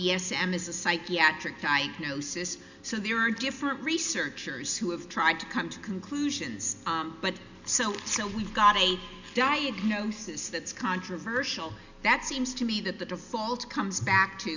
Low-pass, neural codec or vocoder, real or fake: 7.2 kHz; none; real